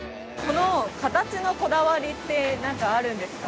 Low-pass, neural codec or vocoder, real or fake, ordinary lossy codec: none; none; real; none